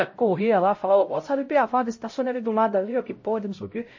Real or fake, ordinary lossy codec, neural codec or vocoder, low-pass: fake; MP3, 32 kbps; codec, 16 kHz, 0.5 kbps, X-Codec, HuBERT features, trained on LibriSpeech; 7.2 kHz